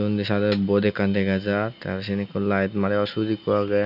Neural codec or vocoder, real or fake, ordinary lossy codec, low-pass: none; real; none; 5.4 kHz